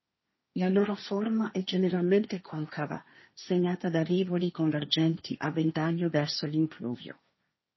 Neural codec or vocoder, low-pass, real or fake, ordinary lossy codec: codec, 16 kHz, 1.1 kbps, Voila-Tokenizer; 7.2 kHz; fake; MP3, 24 kbps